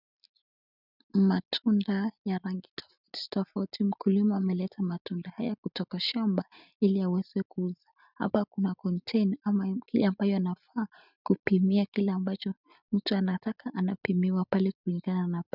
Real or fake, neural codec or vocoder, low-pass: real; none; 5.4 kHz